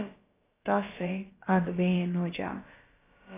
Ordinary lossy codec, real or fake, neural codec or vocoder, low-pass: AAC, 16 kbps; fake; codec, 16 kHz, about 1 kbps, DyCAST, with the encoder's durations; 3.6 kHz